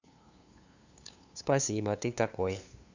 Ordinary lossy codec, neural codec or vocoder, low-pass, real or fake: Opus, 64 kbps; codec, 16 kHz, 2 kbps, FunCodec, trained on LibriTTS, 25 frames a second; 7.2 kHz; fake